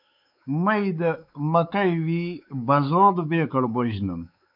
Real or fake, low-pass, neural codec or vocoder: fake; 5.4 kHz; codec, 16 kHz, 4 kbps, X-Codec, WavLM features, trained on Multilingual LibriSpeech